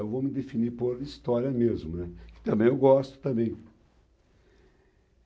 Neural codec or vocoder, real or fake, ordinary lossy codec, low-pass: none; real; none; none